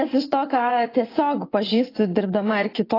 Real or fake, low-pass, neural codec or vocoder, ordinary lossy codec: fake; 5.4 kHz; vocoder, 24 kHz, 100 mel bands, Vocos; AAC, 24 kbps